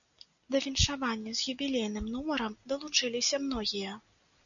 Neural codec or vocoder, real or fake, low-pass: none; real; 7.2 kHz